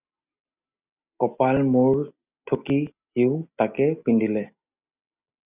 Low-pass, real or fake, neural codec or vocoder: 3.6 kHz; real; none